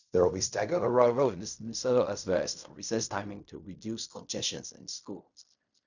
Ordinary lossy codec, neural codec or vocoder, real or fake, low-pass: none; codec, 16 kHz in and 24 kHz out, 0.4 kbps, LongCat-Audio-Codec, fine tuned four codebook decoder; fake; 7.2 kHz